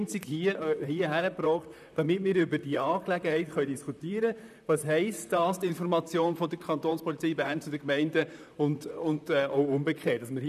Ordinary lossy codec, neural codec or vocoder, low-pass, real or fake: none; vocoder, 44.1 kHz, 128 mel bands, Pupu-Vocoder; 14.4 kHz; fake